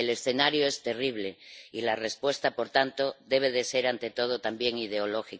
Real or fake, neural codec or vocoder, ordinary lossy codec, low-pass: real; none; none; none